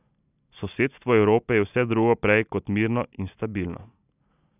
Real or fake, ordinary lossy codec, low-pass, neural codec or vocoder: real; none; 3.6 kHz; none